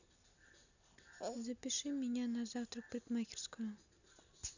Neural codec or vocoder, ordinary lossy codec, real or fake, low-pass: none; none; real; 7.2 kHz